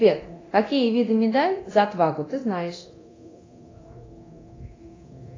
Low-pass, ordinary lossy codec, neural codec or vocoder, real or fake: 7.2 kHz; AAC, 32 kbps; codec, 24 kHz, 0.9 kbps, DualCodec; fake